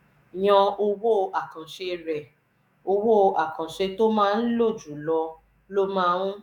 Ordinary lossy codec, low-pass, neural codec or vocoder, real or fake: none; 19.8 kHz; autoencoder, 48 kHz, 128 numbers a frame, DAC-VAE, trained on Japanese speech; fake